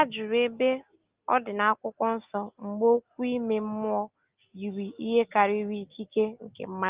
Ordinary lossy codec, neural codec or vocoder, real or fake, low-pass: Opus, 32 kbps; none; real; 3.6 kHz